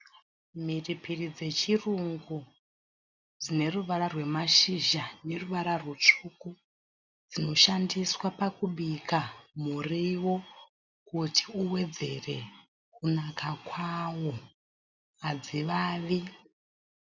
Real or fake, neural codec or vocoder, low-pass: real; none; 7.2 kHz